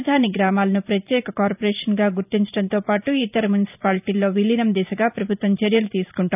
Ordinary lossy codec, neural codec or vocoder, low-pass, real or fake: none; vocoder, 44.1 kHz, 128 mel bands every 512 samples, BigVGAN v2; 3.6 kHz; fake